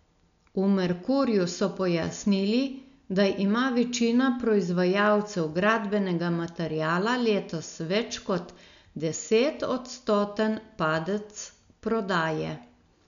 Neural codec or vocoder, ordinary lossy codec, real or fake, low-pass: none; none; real; 7.2 kHz